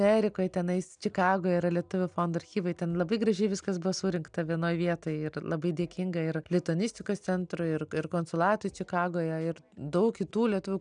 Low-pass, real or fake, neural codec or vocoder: 9.9 kHz; real; none